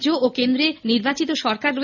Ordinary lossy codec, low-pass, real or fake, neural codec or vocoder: none; 7.2 kHz; real; none